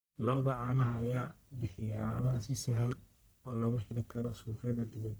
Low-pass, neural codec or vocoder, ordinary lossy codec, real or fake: none; codec, 44.1 kHz, 1.7 kbps, Pupu-Codec; none; fake